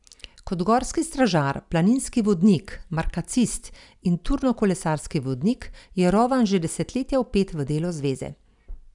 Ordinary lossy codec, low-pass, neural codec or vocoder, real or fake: none; 10.8 kHz; none; real